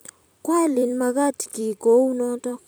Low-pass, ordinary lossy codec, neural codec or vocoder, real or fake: none; none; vocoder, 44.1 kHz, 128 mel bands every 256 samples, BigVGAN v2; fake